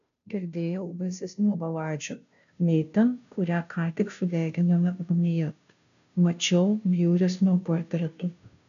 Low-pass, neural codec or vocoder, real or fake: 7.2 kHz; codec, 16 kHz, 0.5 kbps, FunCodec, trained on Chinese and English, 25 frames a second; fake